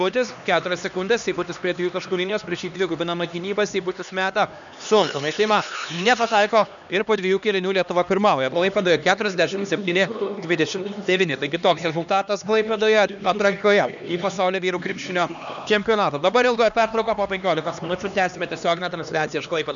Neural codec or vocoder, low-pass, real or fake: codec, 16 kHz, 2 kbps, X-Codec, HuBERT features, trained on LibriSpeech; 7.2 kHz; fake